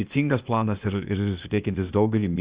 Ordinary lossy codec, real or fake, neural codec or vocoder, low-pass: Opus, 64 kbps; fake; codec, 16 kHz, 0.8 kbps, ZipCodec; 3.6 kHz